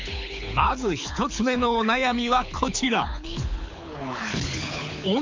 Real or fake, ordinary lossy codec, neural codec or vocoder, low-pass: fake; MP3, 64 kbps; codec, 24 kHz, 6 kbps, HILCodec; 7.2 kHz